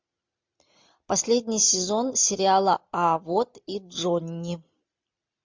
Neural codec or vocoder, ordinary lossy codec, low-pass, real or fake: none; MP3, 64 kbps; 7.2 kHz; real